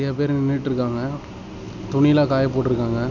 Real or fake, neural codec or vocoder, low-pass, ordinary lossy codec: real; none; 7.2 kHz; none